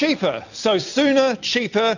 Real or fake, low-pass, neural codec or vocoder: real; 7.2 kHz; none